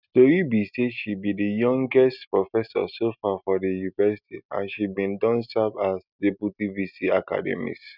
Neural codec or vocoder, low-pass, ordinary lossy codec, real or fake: none; 5.4 kHz; none; real